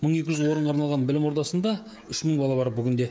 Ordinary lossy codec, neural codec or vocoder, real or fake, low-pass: none; codec, 16 kHz, 16 kbps, FreqCodec, smaller model; fake; none